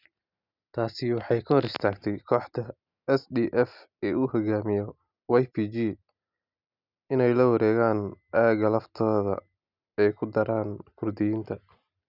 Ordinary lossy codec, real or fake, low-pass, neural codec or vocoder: none; real; 5.4 kHz; none